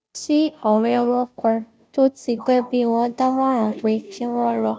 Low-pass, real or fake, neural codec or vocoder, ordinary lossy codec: none; fake; codec, 16 kHz, 0.5 kbps, FunCodec, trained on Chinese and English, 25 frames a second; none